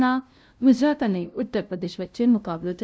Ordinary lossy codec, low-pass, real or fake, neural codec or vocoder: none; none; fake; codec, 16 kHz, 0.5 kbps, FunCodec, trained on LibriTTS, 25 frames a second